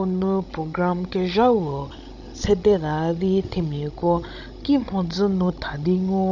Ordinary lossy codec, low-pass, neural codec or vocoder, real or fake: none; 7.2 kHz; codec, 16 kHz, 16 kbps, FreqCodec, larger model; fake